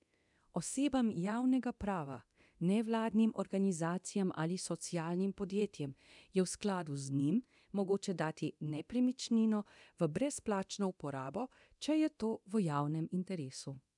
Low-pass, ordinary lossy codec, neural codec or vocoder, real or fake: 10.8 kHz; none; codec, 24 kHz, 0.9 kbps, DualCodec; fake